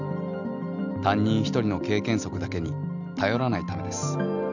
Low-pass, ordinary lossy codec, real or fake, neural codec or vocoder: 7.2 kHz; none; real; none